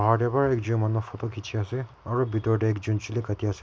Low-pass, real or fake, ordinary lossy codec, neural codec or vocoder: none; real; none; none